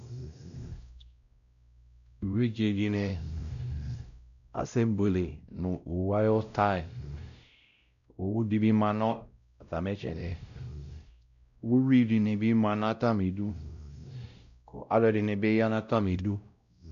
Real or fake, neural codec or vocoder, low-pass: fake; codec, 16 kHz, 0.5 kbps, X-Codec, WavLM features, trained on Multilingual LibriSpeech; 7.2 kHz